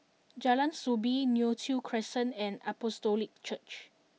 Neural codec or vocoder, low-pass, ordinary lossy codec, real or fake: none; none; none; real